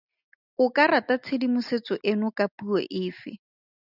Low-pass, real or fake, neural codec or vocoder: 5.4 kHz; real; none